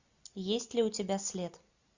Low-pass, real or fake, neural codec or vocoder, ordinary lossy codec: 7.2 kHz; real; none; Opus, 64 kbps